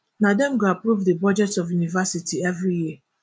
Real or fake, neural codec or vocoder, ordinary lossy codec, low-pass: real; none; none; none